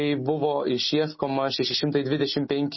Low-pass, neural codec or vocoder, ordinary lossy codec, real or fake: 7.2 kHz; none; MP3, 24 kbps; real